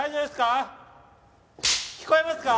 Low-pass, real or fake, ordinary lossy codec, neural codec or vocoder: none; real; none; none